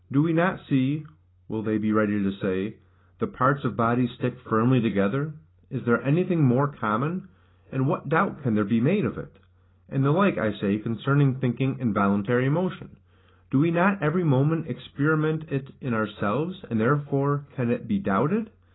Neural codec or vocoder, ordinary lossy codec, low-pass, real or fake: none; AAC, 16 kbps; 7.2 kHz; real